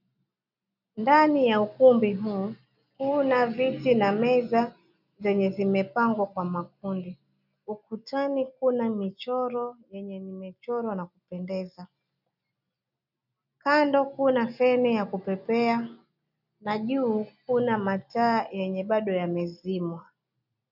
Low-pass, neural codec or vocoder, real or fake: 5.4 kHz; none; real